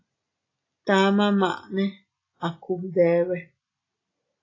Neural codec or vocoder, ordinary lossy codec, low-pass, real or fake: none; AAC, 32 kbps; 7.2 kHz; real